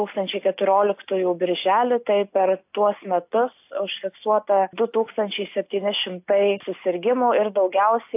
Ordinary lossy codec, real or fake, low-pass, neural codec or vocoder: AAC, 32 kbps; real; 3.6 kHz; none